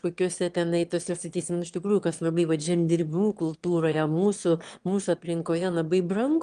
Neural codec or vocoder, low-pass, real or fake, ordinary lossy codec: autoencoder, 22.05 kHz, a latent of 192 numbers a frame, VITS, trained on one speaker; 9.9 kHz; fake; Opus, 24 kbps